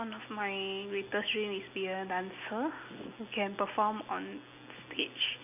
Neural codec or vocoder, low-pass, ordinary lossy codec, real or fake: none; 3.6 kHz; none; real